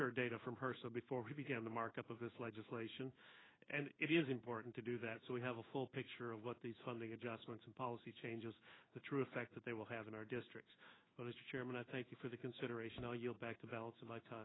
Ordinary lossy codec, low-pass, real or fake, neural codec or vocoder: AAC, 16 kbps; 7.2 kHz; fake; codec, 16 kHz in and 24 kHz out, 1 kbps, XY-Tokenizer